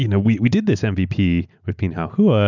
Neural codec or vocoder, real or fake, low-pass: none; real; 7.2 kHz